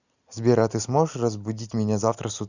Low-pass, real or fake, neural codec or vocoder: 7.2 kHz; real; none